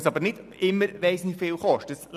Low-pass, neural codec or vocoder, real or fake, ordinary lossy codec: 14.4 kHz; none; real; none